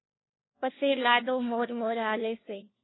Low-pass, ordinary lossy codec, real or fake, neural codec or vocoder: 7.2 kHz; AAC, 16 kbps; fake; codec, 16 kHz, 1 kbps, FunCodec, trained on LibriTTS, 50 frames a second